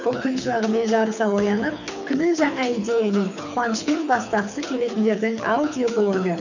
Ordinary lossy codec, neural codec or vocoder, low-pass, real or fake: none; codec, 24 kHz, 6 kbps, HILCodec; 7.2 kHz; fake